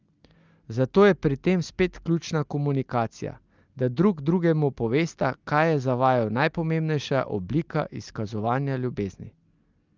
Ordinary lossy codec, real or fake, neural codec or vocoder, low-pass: Opus, 24 kbps; real; none; 7.2 kHz